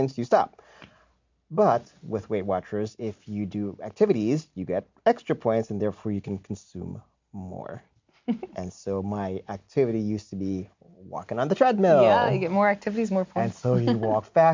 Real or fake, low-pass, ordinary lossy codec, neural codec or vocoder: real; 7.2 kHz; MP3, 64 kbps; none